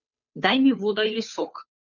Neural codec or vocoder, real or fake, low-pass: codec, 16 kHz, 2 kbps, FunCodec, trained on Chinese and English, 25 frames a second; fake; 7.2 kHz